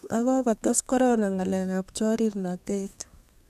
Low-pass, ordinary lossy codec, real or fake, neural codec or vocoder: 14.4 kHz; none; fake; codec, 32 kHz, 1.9 kbps, SNAC